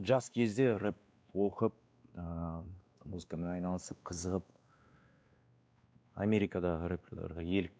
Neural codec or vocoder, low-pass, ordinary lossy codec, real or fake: codec, 16 kHz, 2 kbps, X-Codec, WavLM features, trained on Multilingual LibriSpeech; none; none; fake